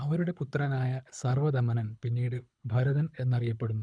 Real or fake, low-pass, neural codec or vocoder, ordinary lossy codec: fake; 9.9 kHz; codec, 24 kHz, 6 kbps, HILCodec; none